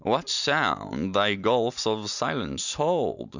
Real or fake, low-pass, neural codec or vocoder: real; 7.2 kHz; none